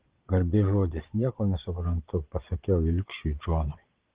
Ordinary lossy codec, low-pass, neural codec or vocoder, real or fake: Opus, 16 kbps; 3.6 kHz; vocoder, 22.05 kHz, 80 mel bands, Vocos; fake